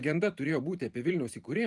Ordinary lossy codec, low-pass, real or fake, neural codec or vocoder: Opus, 24 kbps; 10.8 kHz; fake; vocoder, 44.1 kHz, 128 mel bands every 512 samples, BigVGAN v2